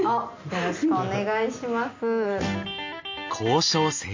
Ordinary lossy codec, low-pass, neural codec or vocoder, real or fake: MP3, 64 kbps; 7.2 kHz; none; real